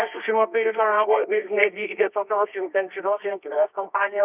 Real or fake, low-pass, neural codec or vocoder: fake; 3.6 kHz; codec, 24 kHz, 0.9 kbps, WavTokenizer, medium music audio release